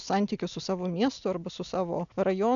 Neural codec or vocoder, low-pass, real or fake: none; 7.2 kHz; real